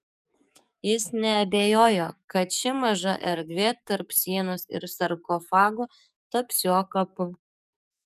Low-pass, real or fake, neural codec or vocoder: 14.4 kHz; fake; codec, 44.1 kHz, 7.8 kbps, DAC